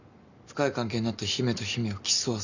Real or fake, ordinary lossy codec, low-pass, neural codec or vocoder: real; none; 7.2 kHz; none